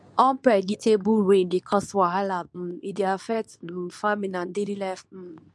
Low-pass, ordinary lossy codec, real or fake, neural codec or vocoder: none; none; fake; codec, 24 kHz, 0.9 kbps, WavTokenizer, medium speech release version 2